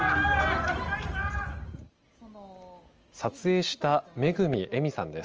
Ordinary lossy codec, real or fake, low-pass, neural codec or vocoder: Opus, 24 kbps; real; 7.2 kHz; none